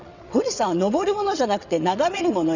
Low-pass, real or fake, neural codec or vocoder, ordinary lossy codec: 7.2 kHz; fake; vocoder, 22.05 kHz, 80 mel bands, WaveNeXt; none